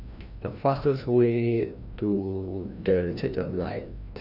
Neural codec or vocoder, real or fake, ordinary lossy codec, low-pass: codec, 16 kHz, 1 kbps, FreqCodec, larger model; fake; none; 5.4 kHz